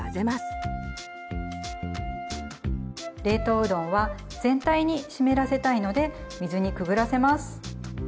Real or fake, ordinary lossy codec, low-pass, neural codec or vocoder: real; none; none; none